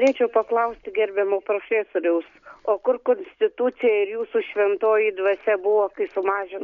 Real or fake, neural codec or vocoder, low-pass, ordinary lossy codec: real; none; 7.2 kHz; MP3, 96 kbps